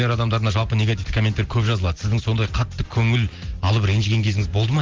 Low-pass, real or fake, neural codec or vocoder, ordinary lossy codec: 7.2 kHz; real; none; Opus, 16 kbps